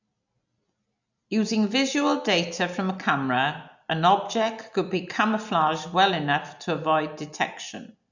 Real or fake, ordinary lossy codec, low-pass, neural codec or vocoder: real; none; 7.2 kHz; none